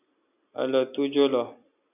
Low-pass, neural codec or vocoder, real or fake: 3.6 kHz; none; real